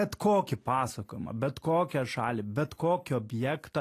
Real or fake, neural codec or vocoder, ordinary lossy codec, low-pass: real; none; AAC, 48 kbps; 14.4 kHz